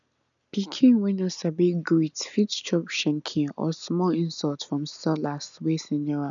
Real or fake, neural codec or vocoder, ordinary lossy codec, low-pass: real; none; none; 7.2 kHz